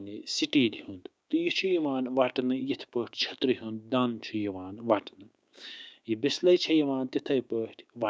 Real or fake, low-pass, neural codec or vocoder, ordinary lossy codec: fake; none; codec, 16 kHz, 6 kbps, DAC; none